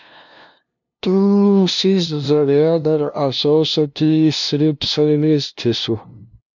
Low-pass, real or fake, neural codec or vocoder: 7.2 kHz; fake; codec, 16 kHz, 0.5 kbps, FunCodec, trained on LibriTTS, 25 frames a second